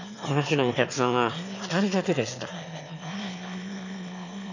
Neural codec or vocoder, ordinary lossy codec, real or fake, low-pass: autoencoder, 22.05 kHz, a latent of 192 numbers a frame, VITS, trained on one speaker; none; fake; 7.2 kHz